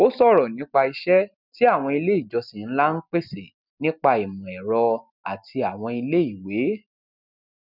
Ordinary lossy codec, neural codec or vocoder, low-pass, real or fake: none; none; 5.4 kHz; real